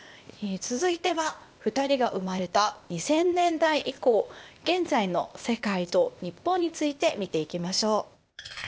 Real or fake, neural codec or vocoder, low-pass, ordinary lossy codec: fake; codec, 16 kHz, 0.8 kbps, ZipCodec; none; none